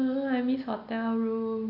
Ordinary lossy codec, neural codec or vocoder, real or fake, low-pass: none; none; real; 5.4 kHz